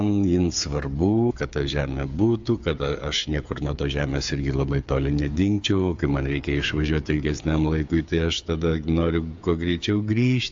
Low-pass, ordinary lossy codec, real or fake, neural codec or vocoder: 7.2 kHz; AAC, 64 kbps; fake; codec, 16 kHz, 8 kbps, FreqCodec, smaller model